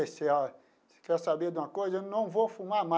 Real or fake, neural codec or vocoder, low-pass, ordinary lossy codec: real; none; none; none